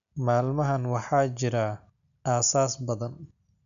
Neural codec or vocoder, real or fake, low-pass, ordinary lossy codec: none; real; 7.2 kHz; MP3, 96 kbps